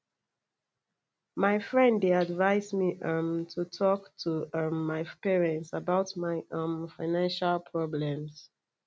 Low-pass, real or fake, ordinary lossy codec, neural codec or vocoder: none; real; none; none